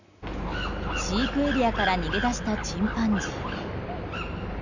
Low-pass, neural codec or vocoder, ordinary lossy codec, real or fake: 7.2 kHz; none; none; real